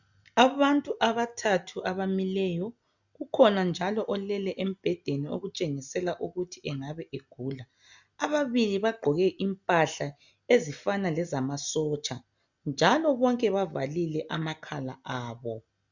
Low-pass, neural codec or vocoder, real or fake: 7.2 kHz; none; real